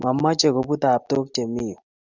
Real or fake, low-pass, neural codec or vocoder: real; 7.2 kHz; none